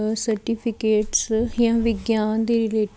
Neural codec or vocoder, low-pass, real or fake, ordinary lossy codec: none; none; real; none